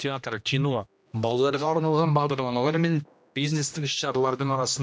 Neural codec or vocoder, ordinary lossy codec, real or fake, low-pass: codec, 16 kHz, 1 kbps, X-Codec, HuBERT features, trained on general audio; none; fake; none